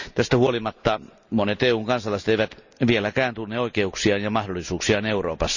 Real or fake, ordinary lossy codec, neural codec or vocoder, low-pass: real; none; none; 7.2 kHz